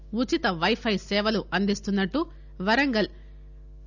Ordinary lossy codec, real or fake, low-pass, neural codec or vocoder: none; real; 7.2 kHz; none